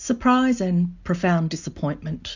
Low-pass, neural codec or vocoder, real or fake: 7.2 kHz; none; real